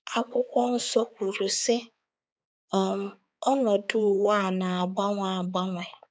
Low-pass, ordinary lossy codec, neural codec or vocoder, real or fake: none; none; codec, 16 kHz, 4 kbps, X-Codec, HuBERT features, trained on balanced general audio; fake